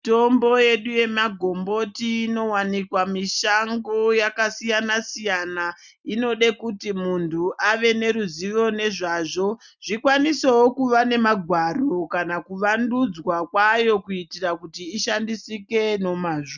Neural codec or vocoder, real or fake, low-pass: none; real; 7.2 kHz